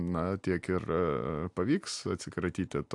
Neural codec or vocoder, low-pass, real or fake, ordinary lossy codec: none; 10.8 kHz; real; AAC, 64 kbps